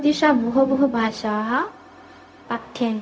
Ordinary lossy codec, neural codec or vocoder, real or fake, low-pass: none; codec, 16 kHz, 0.4 kbps, LongCat-Audio-Codec; fake; none